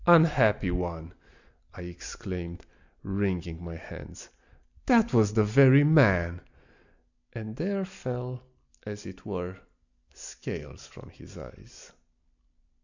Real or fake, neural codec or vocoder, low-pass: real; none; 7.2 kHz